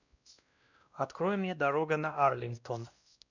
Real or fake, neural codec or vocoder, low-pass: fake; codec, 16 kHz, 1 kbps, X-Codec, WavLM features, trained on Multilingual LibriSpeech; 7.2 kHz